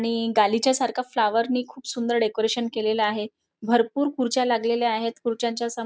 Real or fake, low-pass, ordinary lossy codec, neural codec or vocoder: real; none; none; none